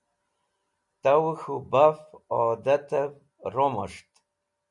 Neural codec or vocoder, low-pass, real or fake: none; 10.8 kHz; real